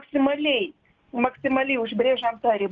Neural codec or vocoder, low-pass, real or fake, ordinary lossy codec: none; 7.2 kHz; real; Opus, 32 kbps